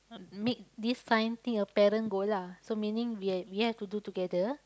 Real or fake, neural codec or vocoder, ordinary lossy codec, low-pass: real; none; none; none